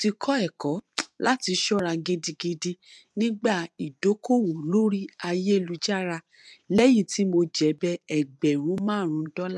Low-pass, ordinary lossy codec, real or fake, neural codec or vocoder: none; none; fake; vocoder, 24 kHz, 100 mel bands, Vocos